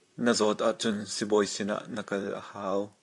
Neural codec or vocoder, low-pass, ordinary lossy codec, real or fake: vocoder, 44.1 kHz, 128 mel bands, Pupu-Vocoder; 10.8 kHz; AAC, 64 kbps; fake